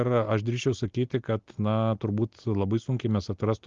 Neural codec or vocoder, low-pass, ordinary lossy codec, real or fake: none; 7.2 kHz; Opus, 32 kbps; real